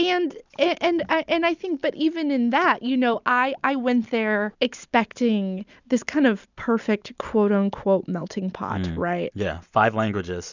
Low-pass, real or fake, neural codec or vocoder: 7.2 kHz; real; none